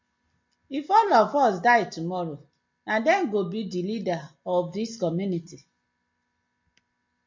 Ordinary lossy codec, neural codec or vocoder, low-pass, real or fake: MP3, 64 kbps; none; 7.2 kHz; real